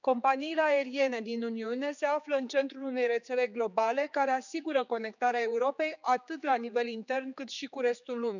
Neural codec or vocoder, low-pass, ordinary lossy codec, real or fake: codec, 16 kHz, 4 kbps, X-Codec, HuBERT features, trained on general audio; 7.2 kHz; none; fake